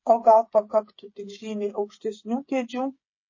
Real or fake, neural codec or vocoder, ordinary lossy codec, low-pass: fake; codec, 16 kHz, 8 kbps, FreqCodec, smaller model; MP3, 32 kbps; 7.2 kHz